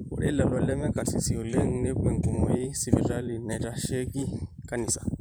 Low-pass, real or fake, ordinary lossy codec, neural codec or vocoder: none; fake; none; vocoder, 44.1 kHz, 128 mel bands every 512 samples, BigVGAN v2